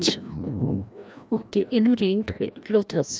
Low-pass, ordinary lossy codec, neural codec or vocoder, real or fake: none; none; codec, 16 kHz, 1 kbps, FreqCodec, larger model; fake